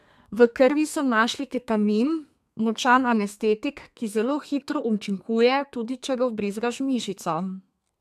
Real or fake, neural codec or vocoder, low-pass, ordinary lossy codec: fake; codec, 32 kHz, 1.9 kbps, SNAC; 14.4 kHz; none